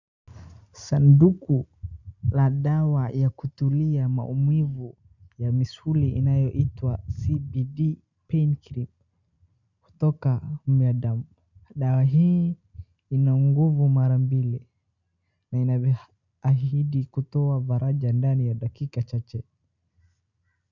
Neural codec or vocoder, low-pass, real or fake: none; 7.2 kHz; real